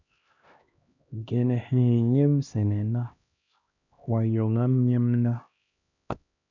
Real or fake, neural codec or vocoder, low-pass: fake; codec, 16 kHz, 1 kbps, X-Codec, HuBERT features, trained on LibriSpeech; 7.2 kHz